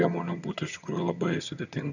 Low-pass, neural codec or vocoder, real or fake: 7.2 kHz; vocoder, 22.05 kHz, 80 mel bands, HiFi-GAN; fake